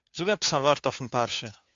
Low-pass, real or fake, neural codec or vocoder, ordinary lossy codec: 7.2 kHz; fake; codec, 16 kHz, 2 kbps, FunCodec, trained on Chinese and English, 25 frames a second; AAC, 64 kbps